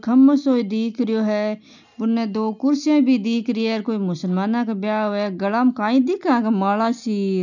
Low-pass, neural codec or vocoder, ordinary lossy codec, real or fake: 7.2 kHz; none; none; real